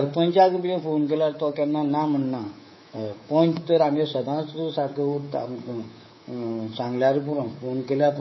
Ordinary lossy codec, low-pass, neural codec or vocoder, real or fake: MP3, 24 kbps; 7.2 kHz; codec, 24 kHz, 3.1 kbps, DualCodec; fake